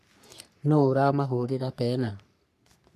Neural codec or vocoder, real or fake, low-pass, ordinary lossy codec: codec, 44.1 kHz, 3.4 kbps, Pupu-Codec; fake; 14.4 kHz; none